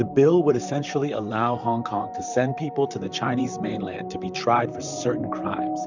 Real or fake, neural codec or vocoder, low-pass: fake; vocoder, 44.1 kHz, 128 mel bands, Pupu-Vocoder; 7.2 kHz